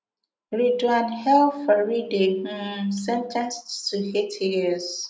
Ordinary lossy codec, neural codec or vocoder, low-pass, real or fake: none; none; none; real